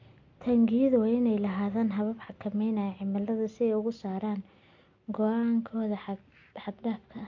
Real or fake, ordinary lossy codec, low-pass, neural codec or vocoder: real; MP3, 48 kbps; 7.2 kHz; none